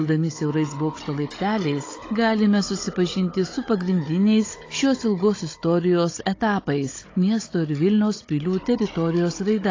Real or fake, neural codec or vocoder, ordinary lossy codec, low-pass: fake; codec, 16 kHz, 16 kbps, FunCodec, trained on Chinese and English, 50 frames a second; AAC, 32 kbps; 7.2 kHz